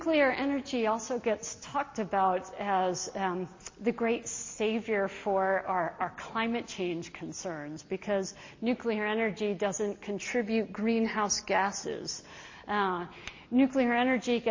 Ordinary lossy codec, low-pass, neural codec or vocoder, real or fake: MP3, 32 kbps; 7.2 kHz; none; real